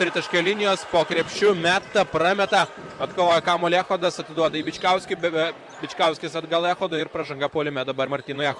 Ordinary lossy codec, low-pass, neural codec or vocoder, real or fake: Opus, 64 kbps; 10.8 kHz; vocoder, 44.1 kHz, 128 mel bands, Pupu-Vocoder; fake